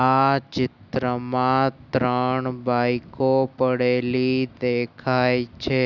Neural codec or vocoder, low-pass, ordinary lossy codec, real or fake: none; 7.2 kHz; none; real